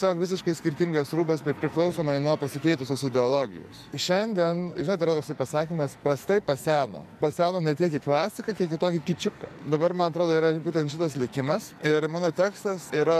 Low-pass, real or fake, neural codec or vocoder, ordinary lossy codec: 14.4 kHz; fake; codec, 44.1 kHz, 2.6 kbps, SNAC; MP3, 96 kbps